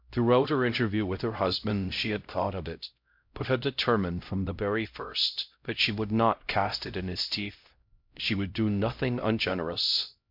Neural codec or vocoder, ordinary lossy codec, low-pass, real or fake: codec, 16 kHz, 0.5 kbps, X-Codec, HuBERT features, trained on LibriSpeech; MP3, 48 kbps; 5.4 kHz; fake